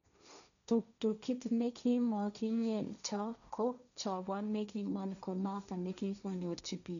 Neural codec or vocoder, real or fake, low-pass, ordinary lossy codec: codec, 16 kHz, 1.1 kbps, Voila-Tokenizer; fake; 7.2 kHz; none